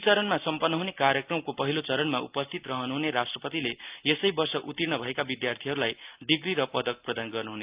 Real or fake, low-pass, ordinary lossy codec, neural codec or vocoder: real; 3.6 kHz; Opus, 24 kbps; none